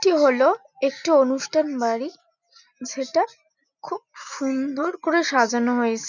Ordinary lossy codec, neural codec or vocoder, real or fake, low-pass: none; none; real; 7.2 kHz